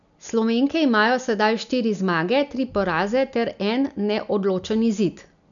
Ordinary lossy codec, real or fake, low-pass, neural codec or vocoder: none; real; 7.2 kHz; none